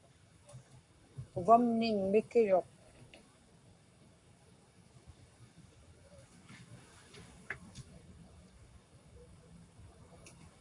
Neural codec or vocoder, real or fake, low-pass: codec, 44.1 kHz, 7.8 kbps, Pupu-Codec; fake; 10.8 kHz